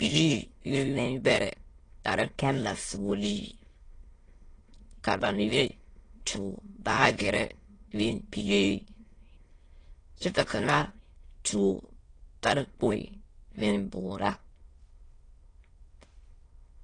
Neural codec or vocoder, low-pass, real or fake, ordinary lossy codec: autoencoder, 22.05 kHz, a latent of 192 numbers a frame, VITS, trained on many speakers; 9.9 kHz; fake; AAC, 32 kbps